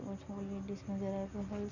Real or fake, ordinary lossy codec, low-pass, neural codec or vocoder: real; none; 7.2 kHz; none